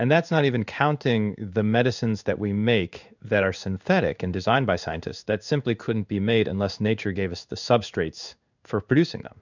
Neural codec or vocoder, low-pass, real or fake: codec, 16 kHz in and 24 kHz out, 1 kbps, XY-Tokenizer; 7.2 kHz; fake